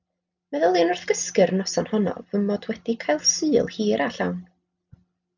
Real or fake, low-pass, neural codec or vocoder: real; 7.2 kHz; none